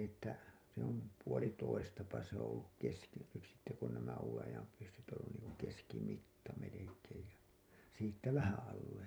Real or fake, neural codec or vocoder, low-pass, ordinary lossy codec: fake; vocoder, 44.1 kHz, 128 mel bands every 256 samples, BigVGAN v2; none; none